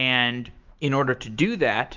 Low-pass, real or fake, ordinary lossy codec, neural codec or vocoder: 7.2 kHz; fake; Opus, 24 kbps; autoencoder, 48 kHz, 128 numbers a frame, DAC-VAE, trained on Japanese speech